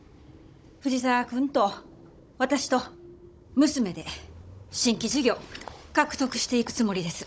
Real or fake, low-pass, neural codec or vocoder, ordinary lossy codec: fake; none; codec, 16 kHz, 16 kbps, FunCodec, trained on Chinese and English, 50 frames a second; none